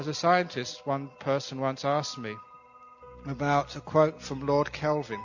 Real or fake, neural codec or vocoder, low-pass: real; none; 7.2 kHz